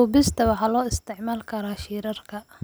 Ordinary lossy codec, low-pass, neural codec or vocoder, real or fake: none; none; none; real